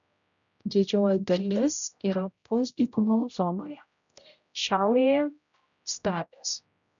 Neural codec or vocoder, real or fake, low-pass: codec, 16 kHz, 0.5 kbps, X-Codec, HuBERT features, trained on general audio; fake; 7.2 kHz